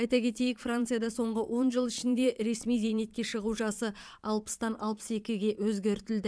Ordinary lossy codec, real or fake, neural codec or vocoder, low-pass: none; fake; vocoder, 22.05 kHz, 80 mel bands, Vocos; none